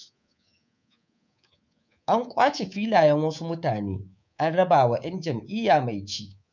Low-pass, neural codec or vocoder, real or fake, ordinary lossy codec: 7.2 kHz; codec, 24 kHz, 3.1 kbps, DualCodec; fake; none